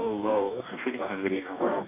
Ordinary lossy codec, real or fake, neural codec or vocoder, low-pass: AAC, 24 kbps; fake; codec, 44.1 kHz, 2.6 kbps, DAC; 3.6 kHz